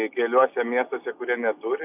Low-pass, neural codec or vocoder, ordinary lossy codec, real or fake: 3.6 kHz; none; AAC, 32 kbps; real